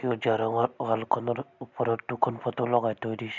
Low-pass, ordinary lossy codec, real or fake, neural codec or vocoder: 7.2 kHz; none; real; none